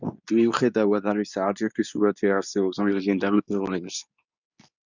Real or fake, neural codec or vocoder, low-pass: fake; codec, 24 kHz, 0.9 kbps, WavTokenizer, medium speech release version 2; 7.2 kHz